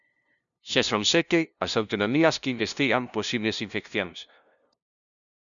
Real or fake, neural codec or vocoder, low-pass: fake; codec, 16 kHz, 0.5 kbps, FunCodec, trained on LibriTTS, 25 frames a second; 7.2 kHz